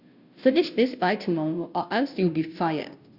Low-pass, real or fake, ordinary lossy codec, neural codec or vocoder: 5.4 kHz; fake; Opus, 64 kbps; codec, 16 kHz, 0.5 kbps, FunCodec, trained on Chinese and English, 25 frames a second